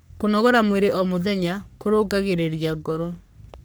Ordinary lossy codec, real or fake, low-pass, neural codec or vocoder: none; fake; none; codec, 44.1 kHz, 3.4 kbps, Pupu-Codec